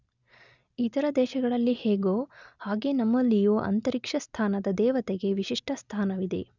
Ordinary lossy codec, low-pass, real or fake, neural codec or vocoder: none; 7.2 kHz; real; none